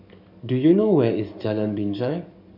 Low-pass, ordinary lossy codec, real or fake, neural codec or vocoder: 5.4 kHz; none; fake; codec, 44.1 kHz, 7.8 kbps, DAC